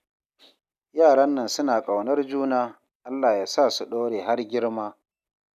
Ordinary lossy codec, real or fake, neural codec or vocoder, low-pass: none; real; none; 14.4 kHz